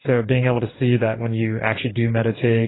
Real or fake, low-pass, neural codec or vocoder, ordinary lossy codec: fake; 7.2 kHz; codec, 44.1 kHz, 2.6 kbps, DAC; AAC, 16 kbps